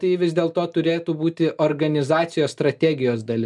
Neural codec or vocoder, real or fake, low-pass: none; real; 10.8 kHz